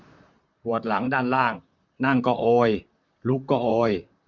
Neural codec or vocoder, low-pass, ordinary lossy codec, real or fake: vocoder, 44.1 kHz, 128 mel bands, Pupu-Vocoder; 7.2 kHz; none; fake